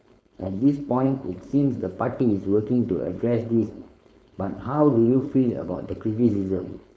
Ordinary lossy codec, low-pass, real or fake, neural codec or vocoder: none; none; fake; codec, 16 kHz, 4.8 kbps, FACodec